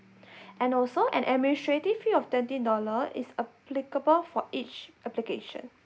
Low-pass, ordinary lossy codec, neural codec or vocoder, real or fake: none; none; none; real